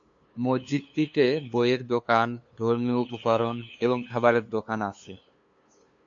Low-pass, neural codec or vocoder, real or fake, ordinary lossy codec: 7.2 kHz; codec, 16 kHz, 2 kbps, FunCodec, trained on LibriTTS, 25 frames a second; fake; MP3, 64 kbps